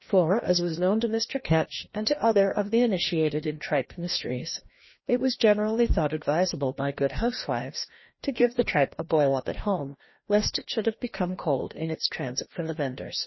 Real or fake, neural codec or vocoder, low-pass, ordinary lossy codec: fake; codec, 16 kHz, 1 kbps, FreqCodec, larger model; 7.2 kHz; MP3, 24 kbps